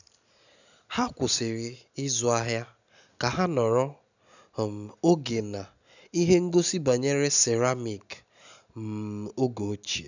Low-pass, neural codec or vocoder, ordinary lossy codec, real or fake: 7.2 kHz; none; none; real